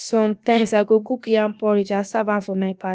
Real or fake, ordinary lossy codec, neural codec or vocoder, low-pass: fake; none; codec, 16 kHz, about 1 kbps, DyCAST, with the encoder's durations; none